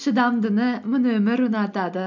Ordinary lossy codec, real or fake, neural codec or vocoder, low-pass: MP3, 64 kbps; real; none; 7.2 kHz